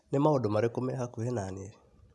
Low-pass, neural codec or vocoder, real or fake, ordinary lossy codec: none; none; real; none